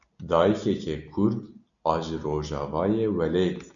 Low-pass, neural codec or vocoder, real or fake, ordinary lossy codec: 7.2 kHz; none; real; MP3, 96 kbps